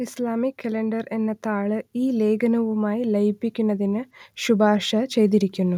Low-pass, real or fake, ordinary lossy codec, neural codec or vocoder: 19.8 kHz; real; none; none